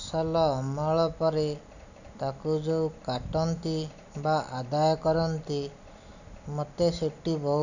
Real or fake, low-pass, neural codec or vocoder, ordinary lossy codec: real; 7.2 kHz; none; none